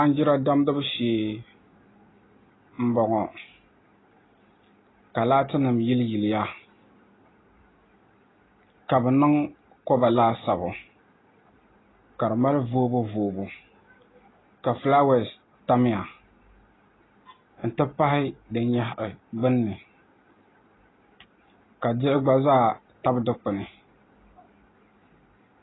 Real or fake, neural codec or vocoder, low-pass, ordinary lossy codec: real; none; 7.2 kHz; AAC, 16 kbps